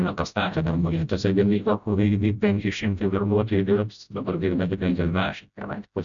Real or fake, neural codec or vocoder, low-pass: fake; codec, 16 kHz, 0.5 kbps, FreqCodec, smaller model; 7.2 kHz